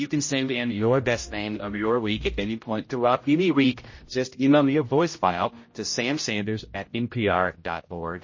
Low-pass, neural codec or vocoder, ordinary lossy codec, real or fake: 7.2 kHz; codec, 16 kHz, 0.5 kbps, X-Codec, HuBERT features, trained on general audio; MP3, 32 kbps; fake